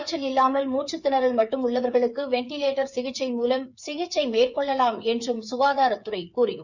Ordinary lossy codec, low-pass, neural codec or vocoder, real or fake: none; 7.2 kHz; codec, 16 kHz, 8 kbps, FreqCodec, smaller model; fake